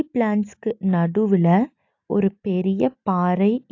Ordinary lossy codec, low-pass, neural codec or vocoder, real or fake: AAC, 48 kbps; 7.2 kHz; none; real